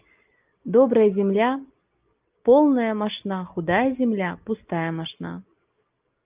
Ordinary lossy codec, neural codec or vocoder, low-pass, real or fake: Opus, 24 kbps; none; 3.6 kHz; real